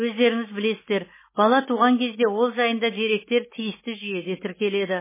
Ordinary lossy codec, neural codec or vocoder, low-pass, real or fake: MP3, 16 kbps; none; 3.6 kHz; real